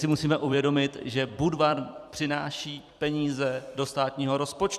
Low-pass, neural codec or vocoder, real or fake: 14.4 kHz; vocoder, 44.1 kHz, 128 mel bands every 256 samples, BigVGAN v2; fake